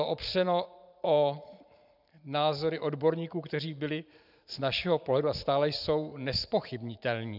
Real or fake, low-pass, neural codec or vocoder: fake; 5.4 kHz; vocoder, 44.1 kHz, 128 mel bands every 512 samples, BigVGAN v2